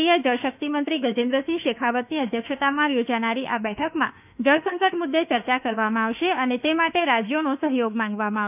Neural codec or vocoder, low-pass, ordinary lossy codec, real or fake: autoencoder, 48 kHz, 32 numbers a frame, DAC-VAE, trained on Japanese speech; 3.6 kHz; none; fake